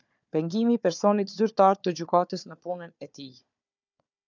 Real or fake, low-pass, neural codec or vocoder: fake; 7.2 kHz; codec, 16 kHz, 4 kbps, FunCodec, trained on Chinese and English, 50 frames a second